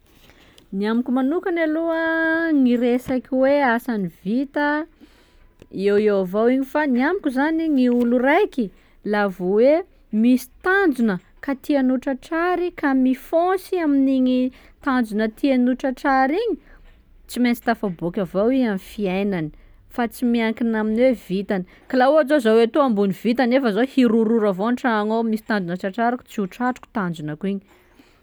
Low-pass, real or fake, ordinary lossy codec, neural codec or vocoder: none; real; none; none